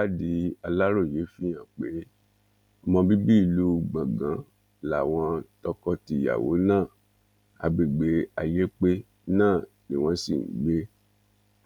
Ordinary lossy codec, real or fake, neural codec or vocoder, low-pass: none; real; none; 19.8 kHz